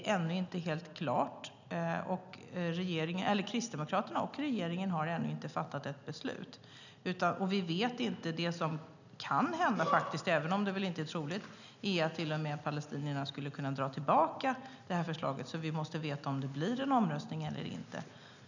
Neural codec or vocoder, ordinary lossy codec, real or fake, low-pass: none; none; real; 7.2 kHz